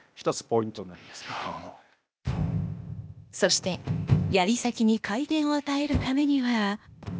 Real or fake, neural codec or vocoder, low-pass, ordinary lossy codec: fake; codec, 16 kHz, 0.8 kbps, ZipCodec; none; none